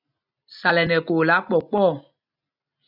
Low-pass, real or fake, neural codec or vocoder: 5.4 kHz; real; none